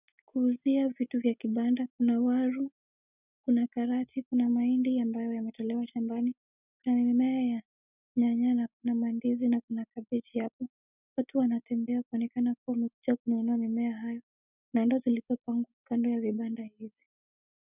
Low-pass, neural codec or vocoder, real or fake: 3.6 kHz; none; real